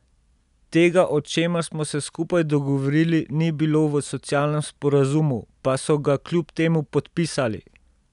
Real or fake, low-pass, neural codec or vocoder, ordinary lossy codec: real; 10.8 kHz; none; none